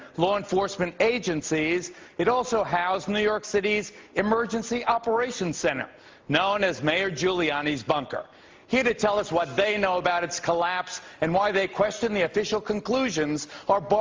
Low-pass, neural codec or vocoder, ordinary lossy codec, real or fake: 7.2 kHz; none; Opus, 32 kbps; real